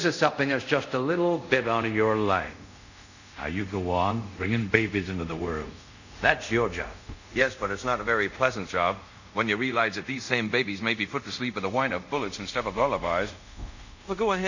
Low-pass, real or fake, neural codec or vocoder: 7.2 kHz; fake; codec, 24 kHz, 0.5 kbps, DualCodec